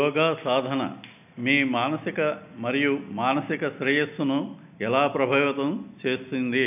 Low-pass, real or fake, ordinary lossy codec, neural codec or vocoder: 3.6 kHz; real; none; none